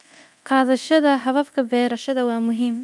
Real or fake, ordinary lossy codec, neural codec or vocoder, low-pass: fake; none; codec, 24 kHz, 0.9 kbps, DualCodec; none